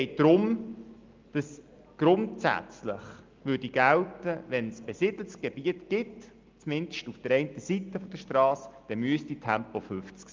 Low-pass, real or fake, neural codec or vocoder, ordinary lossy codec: 7.2 kHz; real; none; Opus, 32 kbps